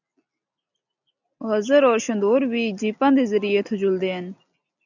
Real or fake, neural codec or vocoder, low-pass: real; none; 7.2 kHz